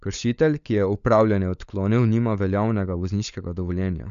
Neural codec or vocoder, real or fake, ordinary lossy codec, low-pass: codec, 16 kHz, 16 kbps, FunCodec, trained on LibriTTS, 50 frames a second; fake; none; 7.2 kHz